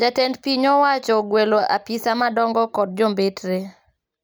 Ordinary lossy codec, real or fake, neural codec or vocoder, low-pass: none; real; none; none